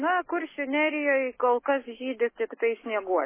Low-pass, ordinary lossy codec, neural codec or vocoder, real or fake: 3.6 kHz; MP3, 16 kbps; none; real